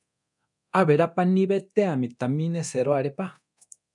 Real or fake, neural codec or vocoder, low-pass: fake; codec, 24 kHz, 0.9 kbps, DualCodec; 10.8 kHz